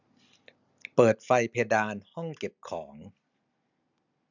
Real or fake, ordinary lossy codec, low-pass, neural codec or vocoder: real; none; 7.2 kHz; none